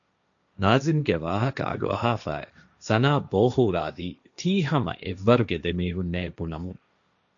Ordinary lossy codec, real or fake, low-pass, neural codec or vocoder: MP3, 96 kbps; fake; 7.2 kHz; codec, 16 kHz, 1.1 kbps, Voila-Tokenizer